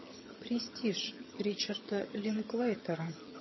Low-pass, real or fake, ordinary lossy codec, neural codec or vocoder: 7.2 kHz; fake; MP3, 24 kbps; vocoder, 22.05 kHz, 80 mel bands, HiFi-GAN